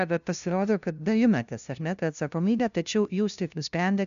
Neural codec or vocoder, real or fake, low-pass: codec, 16 kHz, 0.5 kbps, FunCodec, trained on LibriTTS, 25 frames a second; fake; 7.2 kHz